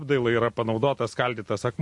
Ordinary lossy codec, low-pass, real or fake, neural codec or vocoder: MP3, 48 kbps; 10.8 kHz; real; none